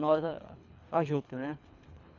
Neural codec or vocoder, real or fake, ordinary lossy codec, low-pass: codec, 24 kHz, 3 kbps, HILCodec; fake; none; 7.2 kHz